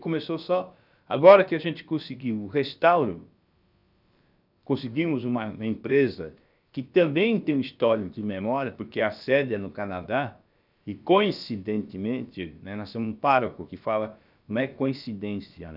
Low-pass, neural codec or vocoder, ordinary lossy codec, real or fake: 5.4 kHz; codec, 16 kHz, about 1 kbps, DyCAST, with the encoder's durations; none; fake